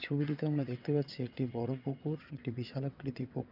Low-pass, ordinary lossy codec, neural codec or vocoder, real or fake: 5.4 kHz; none; vocoder, 22.05 kHz, 80 mel bands, WaveNeXt; fake